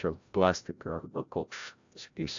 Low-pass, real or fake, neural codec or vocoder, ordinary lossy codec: 7.2 kHz; fake; codec, 16 kHz, 0.5 kbps, FreqCodec, larger model; AAC, 64 kbps